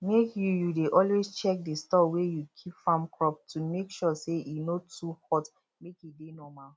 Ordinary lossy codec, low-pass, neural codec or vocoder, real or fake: none; none; none; real